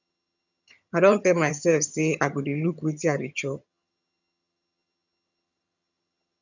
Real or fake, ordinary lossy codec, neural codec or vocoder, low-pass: fake; none; vocoder, 22.05 kHz, 80 mel bands, HiFi-GAN; 7.2 kHz